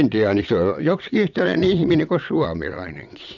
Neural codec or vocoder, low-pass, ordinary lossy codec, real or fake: vocoder, 22.05 kHz, 80 mel bands, WaveNeXt; 7.2 kHz; none; fake